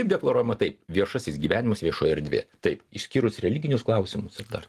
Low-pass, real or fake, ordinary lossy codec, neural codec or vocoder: 14.4 kHz; real; Opus, 24 kbps; none